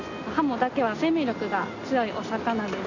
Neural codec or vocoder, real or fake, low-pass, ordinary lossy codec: none; real; 7.2 kHz; AAC, 48 kbps